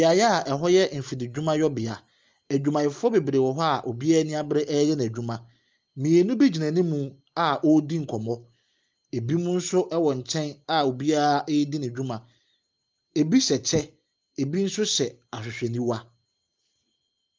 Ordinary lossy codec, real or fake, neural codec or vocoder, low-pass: Opus, 32 kbps; real; none; 7.2 kHz